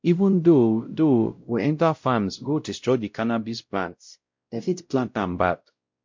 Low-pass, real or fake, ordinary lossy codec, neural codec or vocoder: 7.2 kHz; fake; MP3, 48 kbps; codec, 16 kHz, 0.5 kbps, X-Codec, WavLM features, trained on Multilingual LibriSpeech